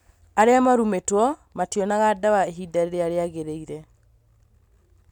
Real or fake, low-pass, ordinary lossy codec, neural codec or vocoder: real; 19.8 kHz; none; none